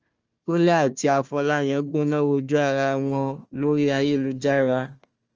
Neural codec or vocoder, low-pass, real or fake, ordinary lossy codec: codec, 16 kHz, 1 kbps, FunCodec, trained on Chinese and English, 50 frames a second; 7.2 kHz; fake; Opus, 24 kbps